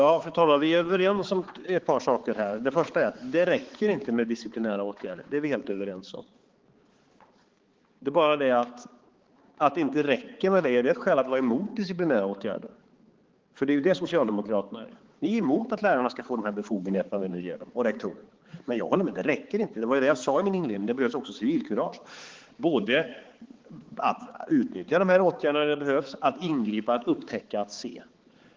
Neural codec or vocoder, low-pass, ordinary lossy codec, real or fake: codec, 16 kHz, 4 kbps, X-Codec, HuBERT features, trained on balanced general audio; 7.2 kHz; Opus, 16 kbps; fake